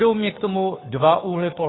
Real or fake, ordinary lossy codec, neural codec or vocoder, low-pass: fake; AAC, 16 kbps; codec, 16 kHz, 2 kbps, FunCodec, trained on Chinese and English, 25 frames a second; 7.2 kHz